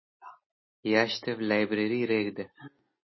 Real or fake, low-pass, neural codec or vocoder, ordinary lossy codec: real; 7.2 kHz; none; MP3, 24 kbps